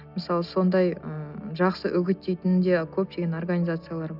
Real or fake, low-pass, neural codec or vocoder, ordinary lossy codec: real; 5.4 kHz; none; none